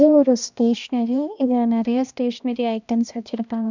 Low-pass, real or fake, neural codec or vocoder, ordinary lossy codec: 7.2 kHz; fake; codec, 16 kHz, 1 kbps, X-Codec, HuBERT features, trained on balanced general audio; none